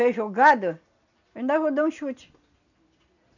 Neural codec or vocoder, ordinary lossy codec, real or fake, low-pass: none; none; real; 7.2 kHz